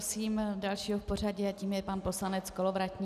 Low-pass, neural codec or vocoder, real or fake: 14.4 kHz; none; real